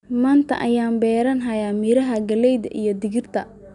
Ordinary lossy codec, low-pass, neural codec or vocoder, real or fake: none; 10.8 kHz; none; real